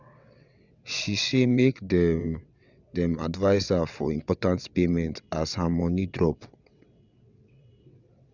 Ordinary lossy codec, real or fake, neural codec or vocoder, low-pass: none; fake; vocoder, 22.05 kHz, 80 mel bands, WaveNeXt; 7.2 kHz